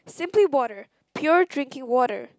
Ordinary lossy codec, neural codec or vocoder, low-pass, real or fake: none; none; none; real